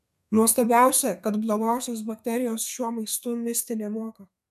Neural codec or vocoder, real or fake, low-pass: codec, 32 kHz, 1.9 kbps, SNAC; fake; 14.4 kHz